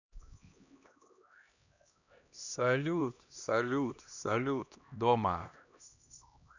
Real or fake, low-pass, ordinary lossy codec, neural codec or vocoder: fake; 7.2 kHz; none; codec, 16 kHz, 1 kbps, X-Codec, HuBERT features, trained on LibriSpeech